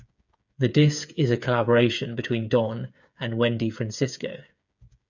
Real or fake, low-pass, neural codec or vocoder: fake; 7.2 kHz; codec, 16 kHz, 8 kbps, FreqCodec, smaller model